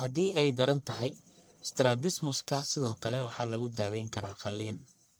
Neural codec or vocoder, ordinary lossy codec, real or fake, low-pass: codec, 44.1 kHz, 1.7 kbps, Pupu-Codec; none; fake; none